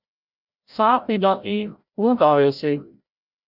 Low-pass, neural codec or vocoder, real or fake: 5.4 kHz; codec, 16 kHz, 0.5 kbps, FreqCodec, larger model; fake